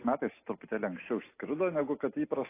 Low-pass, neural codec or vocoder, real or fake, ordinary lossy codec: 3.6 kHz; none; real; AAC, 24 kbps